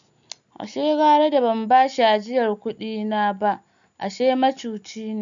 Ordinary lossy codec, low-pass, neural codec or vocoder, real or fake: none; 7.2 kHz; none; real